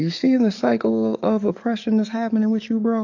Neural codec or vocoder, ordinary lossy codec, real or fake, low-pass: codec, 44.1 kHz, 7.8 kbps, DAC; AAC, 48 kbps; fake; 7.2 kHz